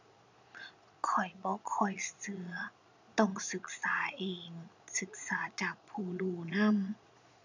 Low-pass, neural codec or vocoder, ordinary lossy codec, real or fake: 7.2 kHz; vocoder, 22.05 kHz, 80 mel bands, Vocos; none; fake